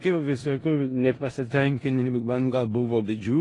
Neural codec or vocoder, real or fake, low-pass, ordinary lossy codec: codec, 16 kHz in and 24 kHz out, 0.4 kbps, LongCat-Audio-Codec, four codebook decoder; fake; 10.8 kHz; AAC, 32 kbps